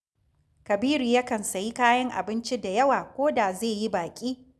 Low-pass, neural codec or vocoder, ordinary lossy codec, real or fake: none; none; none; real